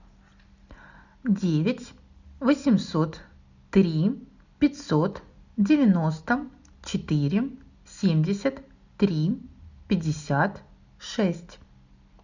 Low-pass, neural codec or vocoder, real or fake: 7.2 kHz; none; real